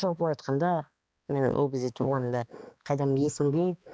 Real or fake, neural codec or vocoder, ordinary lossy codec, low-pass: fake; codec, 16 kHz, 2 kbps, X-Codec, HuBERT features, trained on balanced general audio; none; none